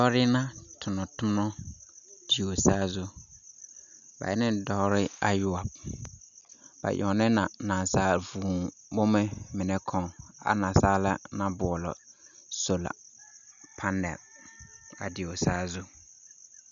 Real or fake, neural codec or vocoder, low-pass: real; none; 7.2 kHz